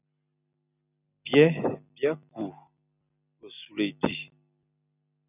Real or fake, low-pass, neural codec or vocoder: real; 3.6 kHz; none